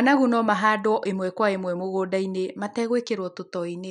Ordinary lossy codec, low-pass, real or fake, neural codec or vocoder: none; 10.8 kHz; real; none